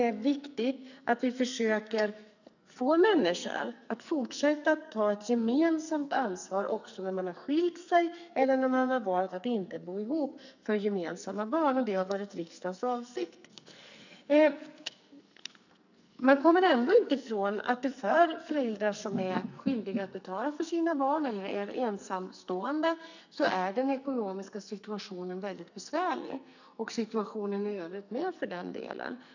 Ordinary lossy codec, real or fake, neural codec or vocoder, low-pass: none; fake; codec, 44.1 kHz, 2.6 kbps, SNAC; 7.2 kHz